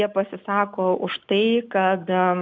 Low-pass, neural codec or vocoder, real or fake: 7.2 kHz; none; real